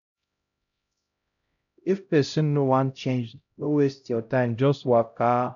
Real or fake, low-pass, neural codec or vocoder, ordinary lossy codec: fake; 7.2 kHz; codec, 16 kHz, 0.5 kbps, X-Codec, HuBERT features, trained on LibriSpeech; none